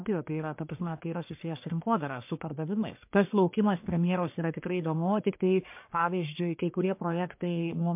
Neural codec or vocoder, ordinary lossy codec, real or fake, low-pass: codec, 44.1 kHz, 1.7 kbps, Pupu-Codec; MP3, 32 kbps; fake; 3.6 kHz